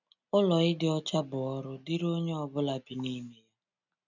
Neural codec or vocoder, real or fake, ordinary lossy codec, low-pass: none; real; none; 7.2 kHz